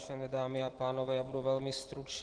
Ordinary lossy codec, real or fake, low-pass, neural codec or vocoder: Opus, 16 kbps; real; 9.9 kHz; none